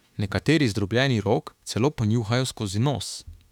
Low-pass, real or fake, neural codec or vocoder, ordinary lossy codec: 19.8 kHz; fake; autoencoder, 48 kHz, 32 numbers a frame, DAC-VAE, trained on Japanese speech; none